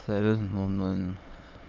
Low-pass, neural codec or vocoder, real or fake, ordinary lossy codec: 7.2 kHz; autoencoder, 22.05 kHz, a latent of 192 numbers a frame, VITS, trained on many speakers; fake; Opus, 24 kbps